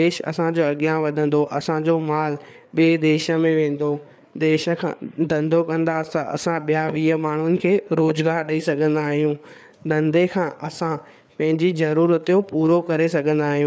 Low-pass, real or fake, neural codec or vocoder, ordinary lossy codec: none; fake; codec, 16 kHz, 4 kbps, FreqCodec, larger model; none